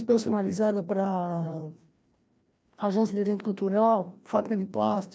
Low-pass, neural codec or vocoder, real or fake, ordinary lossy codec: none; codec, 16 kHz, 1 kbps, FreqCodec, larger model; fake; none